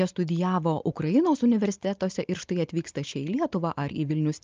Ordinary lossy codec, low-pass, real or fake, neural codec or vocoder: Opus, 24 kbps; 7.2 kHz; real; none